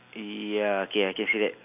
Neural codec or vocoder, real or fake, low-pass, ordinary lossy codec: none; real; 3.6 kHz; none